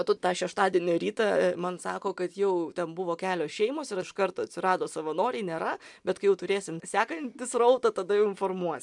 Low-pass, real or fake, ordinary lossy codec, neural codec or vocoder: 10.8 kHz; real; MP3, 96 kbps; none